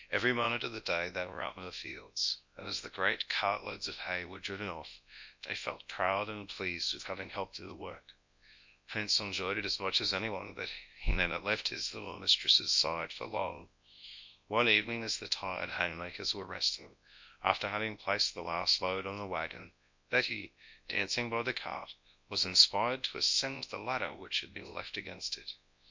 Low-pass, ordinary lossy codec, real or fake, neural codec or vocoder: 7.2 kHz; MP3, 64 kbps; fake; codec, 24 kHz, 0.9 kbps, WavTokenizer, large speech release